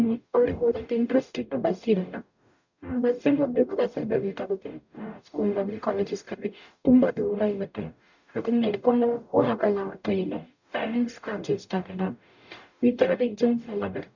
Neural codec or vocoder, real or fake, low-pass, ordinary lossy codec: codec, 44.1 kHz, 0.9 kbps, DAC; fake; 7.2 kHz; none